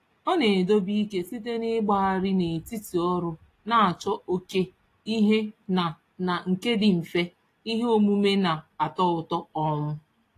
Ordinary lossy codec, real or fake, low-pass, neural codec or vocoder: AAC, 48 kbps; real; 14.4 kHz; none